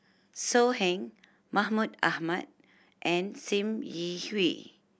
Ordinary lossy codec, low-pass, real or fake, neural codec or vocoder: none; none; real; none